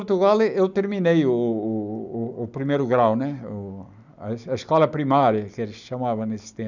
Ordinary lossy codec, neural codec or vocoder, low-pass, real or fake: none; none; 7.2 kHz; real